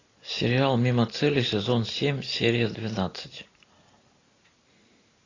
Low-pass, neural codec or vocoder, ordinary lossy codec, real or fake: 7.2 kHz; none; AAC, 32 kbps; real